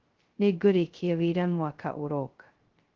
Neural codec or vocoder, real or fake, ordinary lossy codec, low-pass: codec, 16 kHz, 0.2 kbps, FocalCodec; fake; Opus, 16 kbps; 7.2 kHz